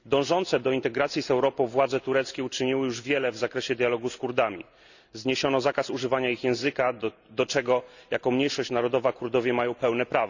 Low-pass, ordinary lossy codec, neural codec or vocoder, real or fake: 7.2 kHz; none; none; real